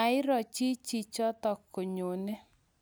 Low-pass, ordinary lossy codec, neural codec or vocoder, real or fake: none; none; none; real